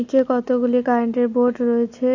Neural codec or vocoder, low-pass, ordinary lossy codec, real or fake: none; 7.2 kHz; AAC, 48 kbps; real